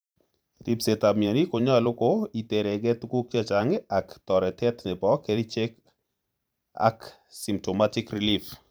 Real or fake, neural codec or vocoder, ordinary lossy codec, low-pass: fake; vocoder, 44.1 kHz, 128 mel bands every 512 samples, BigVGAN v2; none; none